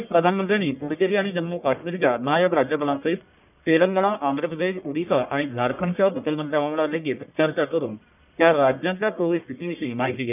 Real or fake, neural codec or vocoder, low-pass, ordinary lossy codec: fake; codec, 44.1 kHz, 1.7 kbps, Pupu-Codec; 3.6 kHz; none